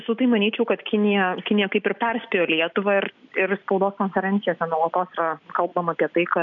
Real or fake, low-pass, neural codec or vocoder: real; 7.2 kHz; none